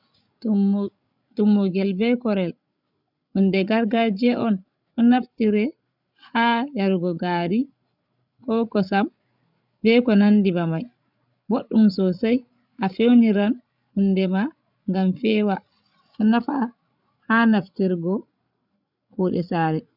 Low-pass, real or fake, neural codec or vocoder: 5.4 kHz; fake; codec, 16 kHz, 16 kbps, FreqCodec, larger model